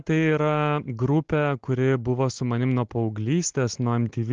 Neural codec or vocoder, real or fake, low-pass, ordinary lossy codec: none; real; 7.2 kHz; Opus, 16 kbps